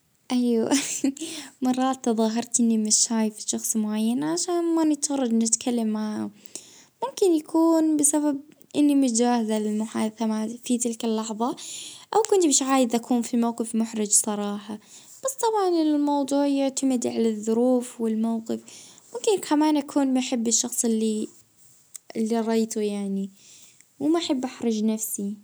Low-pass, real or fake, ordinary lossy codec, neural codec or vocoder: none; real; none; none